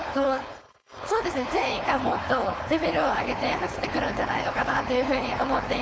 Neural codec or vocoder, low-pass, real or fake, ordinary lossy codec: codec, 16 kHz, 4.8 kbps, FACodec; none; fake; none